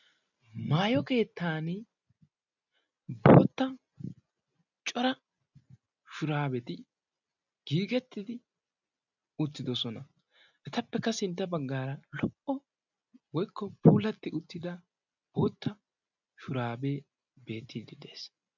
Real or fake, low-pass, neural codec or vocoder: real; 7.2 kHz; none